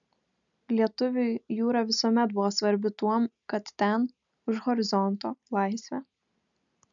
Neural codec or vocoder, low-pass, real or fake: none; 7.2 kHz; real